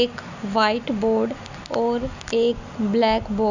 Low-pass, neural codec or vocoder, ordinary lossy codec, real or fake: 7.2 kHz; none; none; real